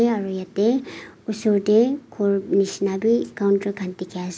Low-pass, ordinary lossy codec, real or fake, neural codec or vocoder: none; none; real; none